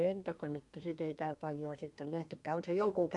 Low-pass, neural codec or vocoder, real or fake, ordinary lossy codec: 10.8 kHz; codec, 24 kHz, 1 kbps, SNAC; fake; none